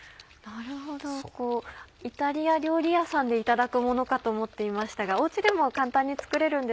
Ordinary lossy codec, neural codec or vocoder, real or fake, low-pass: none; none; real; none